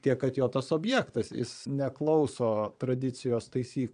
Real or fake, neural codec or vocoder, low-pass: fake; vocoder, 22.05 kHz, 80 mel bands, Vocos; 9.9 kHz